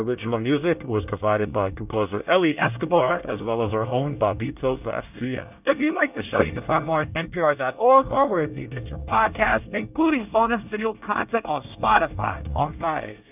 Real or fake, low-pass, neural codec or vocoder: fake; 3.6 kHz; codec, 24 kHz, 1 kbps, SNAC